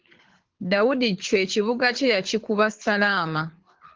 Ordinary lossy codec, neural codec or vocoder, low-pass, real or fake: Opus, 16 kbps; codec, 24 kHz, 6 kbps, HILCodec; 7.2 kHz; fake